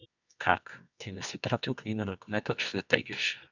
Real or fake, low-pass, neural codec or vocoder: fake; 7.2 kHz; codec, 24 kHz, 0.9 kbps, WavTokenizer, medium music audio release